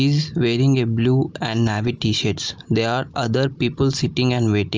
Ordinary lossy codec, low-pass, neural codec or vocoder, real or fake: Opus, 24 kbps; 7.2 kHz; none; real